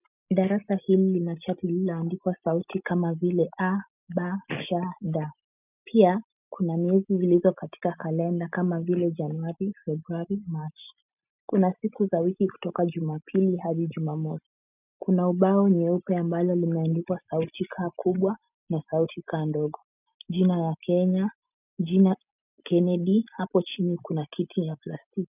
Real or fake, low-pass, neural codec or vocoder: real; 3.6 kHz; none